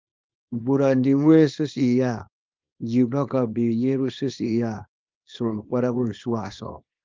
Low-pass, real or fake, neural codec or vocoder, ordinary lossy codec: 7.2 kHz; fake; codec, 24 kHz, 0.9 kbps, WavTokenizer, small release; Opus, 32 kbps